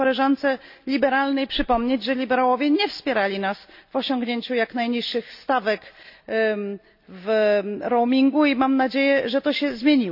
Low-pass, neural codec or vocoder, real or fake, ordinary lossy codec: 5.4 kHz; none; real; none